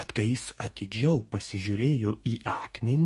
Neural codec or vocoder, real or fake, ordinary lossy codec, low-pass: codec, 44.1 kHz, 2.6 kbps, DAC; fake; MP3, 48 kbps; 14.4 kHz